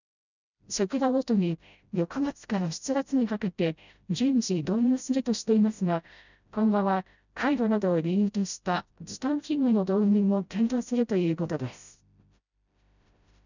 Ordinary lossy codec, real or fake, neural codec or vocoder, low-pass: none; fake; codec, 16 kHz, 0.5 kbps, FreqCodec, smaller model; 7.2 kHz